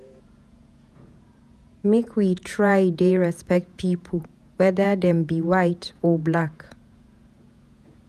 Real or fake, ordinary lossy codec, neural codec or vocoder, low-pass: fake; none; vocoder, 48 kHz, 128 mel bands, Vocos; 14.4 kHz